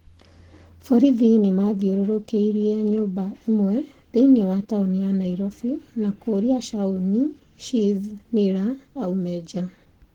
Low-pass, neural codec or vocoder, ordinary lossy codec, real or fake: 19.8 kHz; codec, 44.1 kHz, 7.8 kbps, Pupu-Codec; Opus, 16 kbps; fake